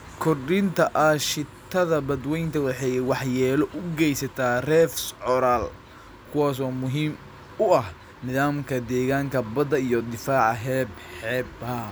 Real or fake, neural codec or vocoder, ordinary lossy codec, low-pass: real; none; none; none